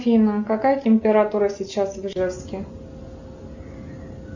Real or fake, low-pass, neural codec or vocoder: real; 7.2 kHz; none